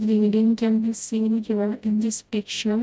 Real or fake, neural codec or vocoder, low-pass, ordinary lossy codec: fake; codec, 16 kHz, 0.5 kbps, FreqCodec, smaller model; none; none